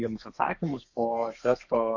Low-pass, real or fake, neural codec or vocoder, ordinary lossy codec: 7.2 kHz; fake; codec, 32 kHz, 1.9 kbps, SNAC; Opus, 64 kbps